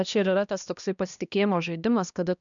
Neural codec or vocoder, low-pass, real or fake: codec, 16 kHz, 1 kbps, X-Codec, HuBERT features, trained on balanced general audio; 7.2 kHz; fake